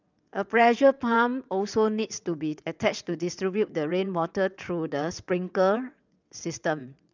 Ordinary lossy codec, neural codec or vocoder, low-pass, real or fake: none; vocoder, 22.05 kHz, 80 mel bands, WaveNeXt; 7.2 kHz; fake